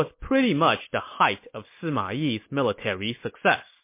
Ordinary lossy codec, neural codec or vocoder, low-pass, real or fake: MP3, 24 kbps; none; 3.6 kHz; real